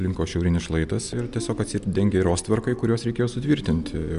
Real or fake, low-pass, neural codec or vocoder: real; 10.8 kHz; none